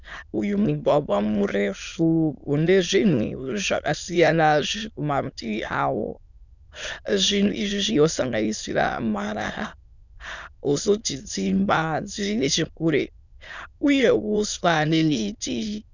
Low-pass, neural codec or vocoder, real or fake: 7.2 kHz; autoencoder, 22.05 kHz, a latent of 192 numbers a frame, VITS, trained on many speakers; fake